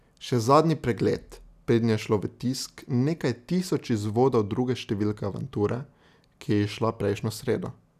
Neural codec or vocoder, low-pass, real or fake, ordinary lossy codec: none; 14.4 kHz; real; none